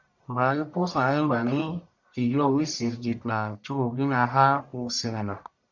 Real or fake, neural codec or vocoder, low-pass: fake; codec, 44.1 kHz, 1.7 kbps, Pupu-Codec; 7.2 kHz